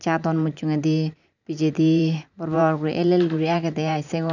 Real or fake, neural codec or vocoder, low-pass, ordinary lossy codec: fake; vocoder, 44.1 kHz, 128 mel bands every 512 samples, BigVGAN v2; 7.2 kHz; none